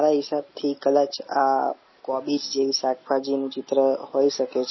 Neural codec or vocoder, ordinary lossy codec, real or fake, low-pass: none; MP3, 24 kbps; real; 7.2 kHz